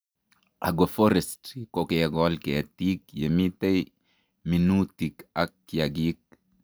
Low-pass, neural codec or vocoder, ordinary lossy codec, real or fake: none; none; none; real